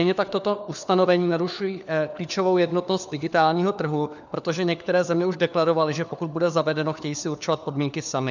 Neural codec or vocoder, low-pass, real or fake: codec, 16 kHz, 4 kbps, FunCodec, trained on LibriTTS, 50 frames a second; 7.2 kHz; fake